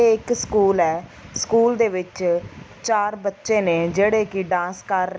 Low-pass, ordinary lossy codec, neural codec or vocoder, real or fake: none; none; none; real